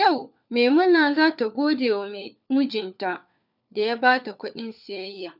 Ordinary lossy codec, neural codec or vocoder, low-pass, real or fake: none; codec, 16 kHz, 4 kbps, FunCodec, trained on LibriTTS, 50 frames a second; 5.4 kHz; fake